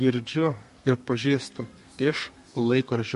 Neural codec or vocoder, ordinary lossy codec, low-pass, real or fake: codec, 32 kHz, 1.9 kbps, SNAC; MP3, 48 kbps; 14.4 kHz; fake